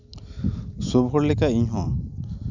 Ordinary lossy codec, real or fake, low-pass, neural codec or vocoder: none; real; 7.2 kHz; none